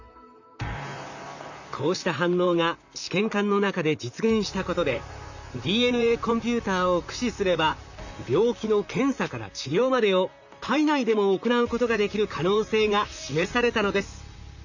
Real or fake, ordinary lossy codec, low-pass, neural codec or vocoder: fake; none; 7.2 kHz; vocoder, 44.1 kHz, 128 mel bands, Pupu-Vocoder